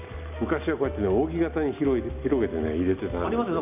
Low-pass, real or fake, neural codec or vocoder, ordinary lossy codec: 3.6 kHz; real; none; none